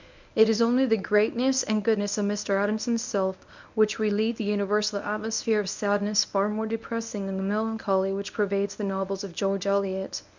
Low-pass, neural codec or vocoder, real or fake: 7.2 kHz; codec, 24 kHz, 0.9 kbps, WavTokenizer, medium speech release version 1; fake